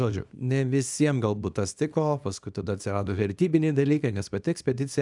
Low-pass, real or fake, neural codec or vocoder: 10.8 kHz; fake; codec, 24 kHz, 0.9 kbps, WavTokenizer, small release